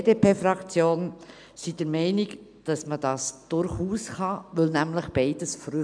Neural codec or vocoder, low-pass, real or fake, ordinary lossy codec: none; 9.9 kHz; real; none